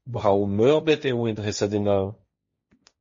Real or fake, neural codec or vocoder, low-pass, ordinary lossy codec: fake; codec, 16 kHz, 1.1 kbps, Voila-Tokenizer; 7.2 kHz; MP3, 32 kbps